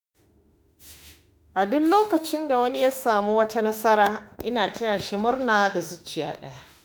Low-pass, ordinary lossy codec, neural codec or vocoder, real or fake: none; none; autoencoder, 48 kHz, 32 numbers a frame, DAC-VAE, trained on Japanese speech; fake